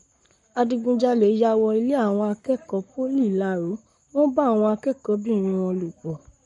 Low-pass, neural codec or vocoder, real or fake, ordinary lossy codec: 19.8 kHz; codec, 44.1 kHz, 7.8 kbps, Pupu-Codec; fake; MP3, 48 kbps